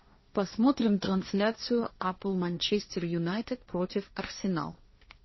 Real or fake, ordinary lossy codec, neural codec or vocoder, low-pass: fake; MP3, 24 kbps; codec, 16 kHz, 1.1 kbps, Voila-Tokenizer; 7.2 kHz